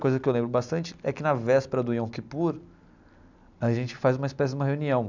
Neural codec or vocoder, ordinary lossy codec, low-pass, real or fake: none; none; 7.2 kHz; real